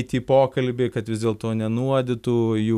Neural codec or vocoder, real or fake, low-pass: none; real; 14.4 kHz